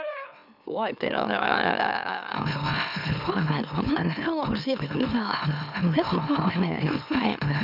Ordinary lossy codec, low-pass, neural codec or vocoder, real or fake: none; 5.4 kHz; autoencoder, 44.1 kHz, a latent of 192 numbers a frame, MeloTTS; fake